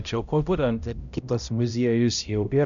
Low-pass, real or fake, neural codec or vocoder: 7.2 kHz; fake; codec, 16 kHz, 0.5 kbps, X-Codec, HuBERT features, trained on balanced general audio